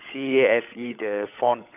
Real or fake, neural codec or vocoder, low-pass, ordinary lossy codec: fake; codec, 16 kHz, 16 kbps, FunCodec, trained on LibriTTS, 50 frames a second; 3.6 kHz; AAC, 32 kbps